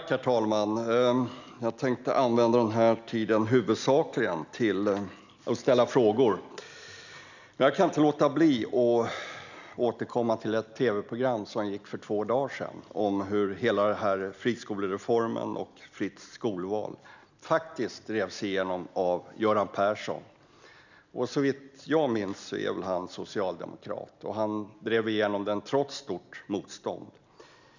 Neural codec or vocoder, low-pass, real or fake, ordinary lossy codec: none; 7.2 kHz; real; none